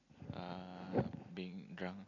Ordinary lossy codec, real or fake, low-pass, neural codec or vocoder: none; real; 7.2 kHz; none